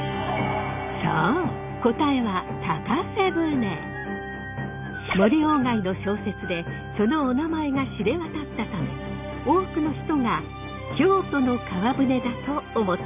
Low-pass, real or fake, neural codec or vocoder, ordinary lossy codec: 3.6 kHz; real; none; none